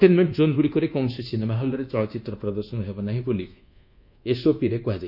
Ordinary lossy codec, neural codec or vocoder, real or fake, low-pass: none; codec, 24 kHz, 1.2 kbps, DualCodec; fake; 5.4 kHz